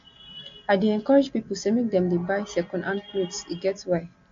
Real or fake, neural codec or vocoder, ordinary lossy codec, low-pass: real; none; AAC, 48 kbps; 7.2 kHz